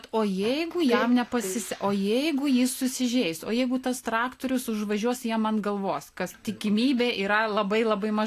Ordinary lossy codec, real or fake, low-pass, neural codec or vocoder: AAC, 64 kbps; real; 14.4 kHz; none